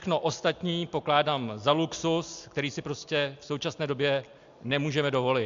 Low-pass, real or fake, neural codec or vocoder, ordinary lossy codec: 7.2 kHz; real; none; AAC, 64 kbps